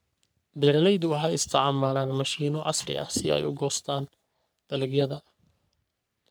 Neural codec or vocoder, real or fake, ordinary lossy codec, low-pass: codec, 44.1 kHz, 3.4 kbps, Pupu-Codec; fake; none; none